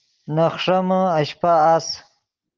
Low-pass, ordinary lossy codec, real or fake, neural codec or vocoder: 7.2 kHz; Opus, 16 kbps; real; none